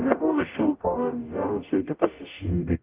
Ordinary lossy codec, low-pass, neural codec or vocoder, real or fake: Opus, 32 kbps; 3.6 kHz; codec, 44.1 kHz, 0.9 kbps, DAC; fake